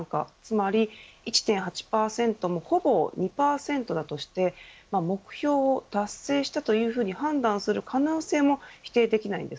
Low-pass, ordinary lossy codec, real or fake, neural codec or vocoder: none; none; real; none